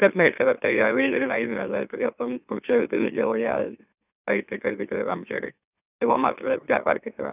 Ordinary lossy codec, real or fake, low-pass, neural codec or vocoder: none; fake; 3.6 kHz; autoencoder, 44.1 kHz, a latent of 192 numbers a frame, MeloTTS